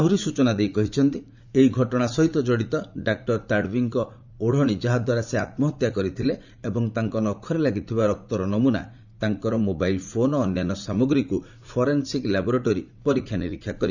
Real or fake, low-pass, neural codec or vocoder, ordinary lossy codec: fake; 7.2 kHz; vocoder, 44.1 kHz, 80 mel bands, Vocos; none